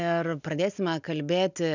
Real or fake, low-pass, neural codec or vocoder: real; 7.2 kHz; none